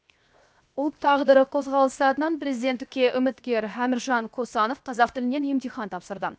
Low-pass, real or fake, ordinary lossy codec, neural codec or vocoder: none; fake; none; codec, 16 kHz, 0.7 kbps, FocalCodec